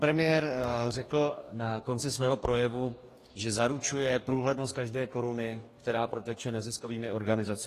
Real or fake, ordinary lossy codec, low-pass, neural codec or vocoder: fake; AAC, 48 kbps; 14.4 kHz; codec, 44.1 kHz, 2.6 kbps, DAC